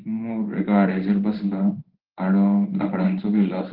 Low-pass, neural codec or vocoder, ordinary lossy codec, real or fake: 5.4 kHz; codec, 16 kHz in and 24 kHz out, 1 kbps, XY-Tokenizer; Opus, 16 kbps; fake